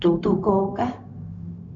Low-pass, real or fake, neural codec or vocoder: 7.2 kHz; fake; codec, 16 kHz, 0.4 kbps, LongCat-Audio-Codec